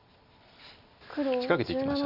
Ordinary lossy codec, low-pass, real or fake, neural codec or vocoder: none; 5.4 kHz; real; none